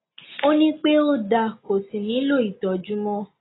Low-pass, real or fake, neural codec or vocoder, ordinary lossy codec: 7.2 kHz; real; none; AAC, 16 kbps